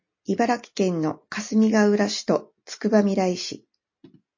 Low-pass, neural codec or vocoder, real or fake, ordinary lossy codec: 7.2 kHz; none; real; MP3, 32 kbps